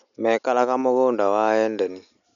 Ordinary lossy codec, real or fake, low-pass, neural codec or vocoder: none; real; 7.2 kHz; none